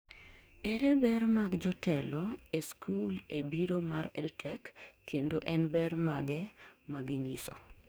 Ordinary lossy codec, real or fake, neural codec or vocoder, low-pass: none; fake; codec, 44.1 kHz, 2.6 kbps, DAC; none